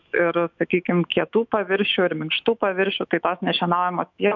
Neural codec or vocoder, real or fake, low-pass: autoencoder, 48 kHz, 128 numbers a frame, DAC-VAE, trained on Japanese speech; fake; 7.2 kHz